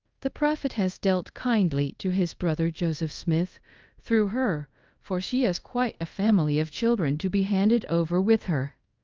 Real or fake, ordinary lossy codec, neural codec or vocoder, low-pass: fake; Opus, 24 kbps; codec, 24 kHz, 0.5 kbps, DualCodec; 7.2 kHz